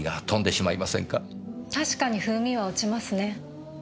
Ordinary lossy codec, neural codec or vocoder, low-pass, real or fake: none; none; none; real